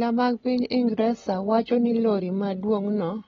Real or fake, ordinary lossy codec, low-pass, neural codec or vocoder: real; AAC, 24 kbps; 7.2 kHz; none